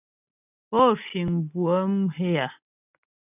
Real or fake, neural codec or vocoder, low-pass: real; none; 3.6 kHz